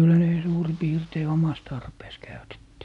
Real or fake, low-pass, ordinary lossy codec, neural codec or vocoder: real; 10.8 kHz; none; none